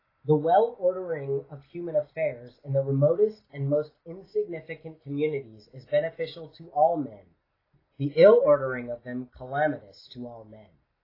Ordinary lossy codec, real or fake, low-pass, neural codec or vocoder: AAC, 24 kbps; real; 5.4 kHz; none